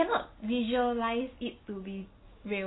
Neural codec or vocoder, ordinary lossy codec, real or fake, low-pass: none; AAC, 16 kbps; real; 7.2 kHz